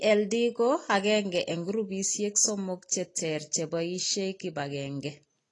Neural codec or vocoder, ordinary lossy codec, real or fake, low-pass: none; AAC, 32 kbps; real; 10.8 kHz